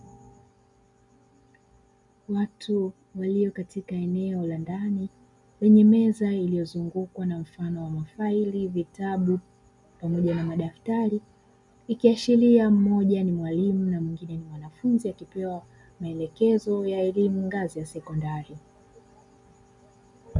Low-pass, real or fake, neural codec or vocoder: 10.8 kHz; real; none